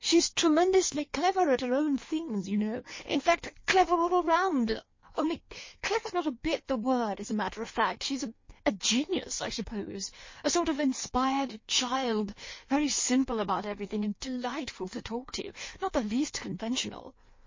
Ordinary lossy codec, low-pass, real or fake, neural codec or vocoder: MP3, 32 kbps; 7.2 kHz; fake; codec, 16 kHz in and 24 kHz out, 1.1 kbps, FireRedTTS-2 codec